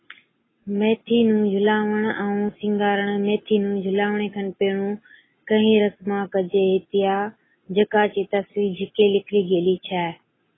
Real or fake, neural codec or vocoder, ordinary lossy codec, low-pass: real; none; AAC, 16 kbps; 7.2 kHz